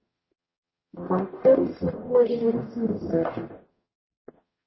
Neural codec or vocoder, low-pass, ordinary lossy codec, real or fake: codec, 44.1 kHz, 0.9 kbps, DAC; 7.2 kHz; MP3, 24 kbps; fake